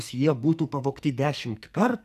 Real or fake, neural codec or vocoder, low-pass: fake; codec, 44.1 kHz, 2.6 kbps, SNAC; 14.4 kHz